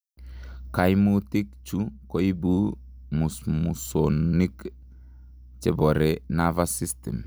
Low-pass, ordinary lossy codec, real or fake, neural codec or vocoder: none; none; real; none